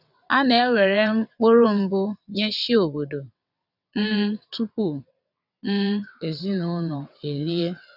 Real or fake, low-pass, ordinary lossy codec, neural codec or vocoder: fake; 5.4 kHz; none; vocoder, 22.05 kHz, 80 mel bands, WaveNeXt